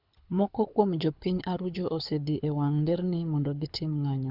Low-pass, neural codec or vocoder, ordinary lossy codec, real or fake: 5.4 kHz; codec, 24 kHz, 6 kbps, HILCodec; Opus, 64 kbps; fake